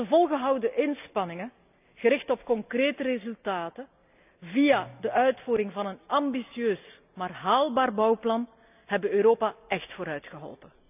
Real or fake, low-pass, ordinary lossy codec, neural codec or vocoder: real; 3.6 kHz; none; none